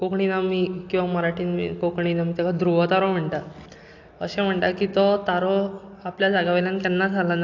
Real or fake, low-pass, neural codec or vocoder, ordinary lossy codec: real; 7.2 kHz; none; AAC, 48 kbps